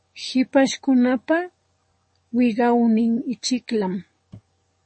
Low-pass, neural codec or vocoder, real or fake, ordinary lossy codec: 10.8 kHz; codec, 44.1 kHz, 7.8 kbps, DAC; fake; MP3, 32 kbps